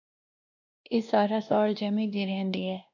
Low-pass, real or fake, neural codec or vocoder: 7.2 kHz; fake; codec, 16 kHz, 1 kbps, X-Codec, WavLM features, trained on Multilingual LibriSpeech